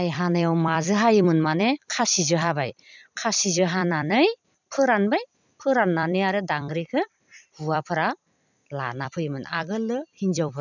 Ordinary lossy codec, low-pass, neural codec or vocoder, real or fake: none; 7.2 kHz; vocoder, 44.1 kHz, 128 mel bands every 256 samples, BigVGAN v2; fake